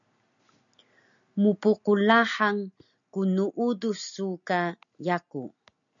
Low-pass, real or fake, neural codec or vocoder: 7.2 kHz; real; none